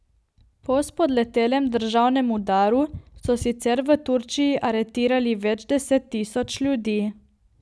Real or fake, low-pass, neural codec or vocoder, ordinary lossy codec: real; none; none; none